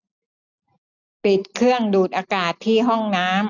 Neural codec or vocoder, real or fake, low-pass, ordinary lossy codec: none; real; 7.2 kHz; none